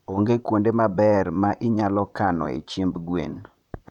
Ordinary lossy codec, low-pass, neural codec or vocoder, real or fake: none; 19.8 kHz; vocoder, 44.1 kHz, 128 mel bands, Pupu-Vocoder; fake